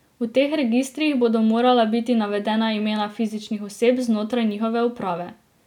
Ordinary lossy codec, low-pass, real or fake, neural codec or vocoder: none; 19.8 kHz; real; none